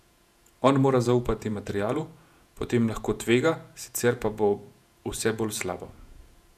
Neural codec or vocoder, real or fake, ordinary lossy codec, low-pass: vocoder, 48 kHz, 128 mel bands, Vocos; fake; none; 14.4 kHz